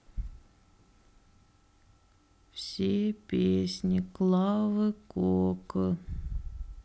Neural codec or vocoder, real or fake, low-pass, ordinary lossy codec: none; real; none; none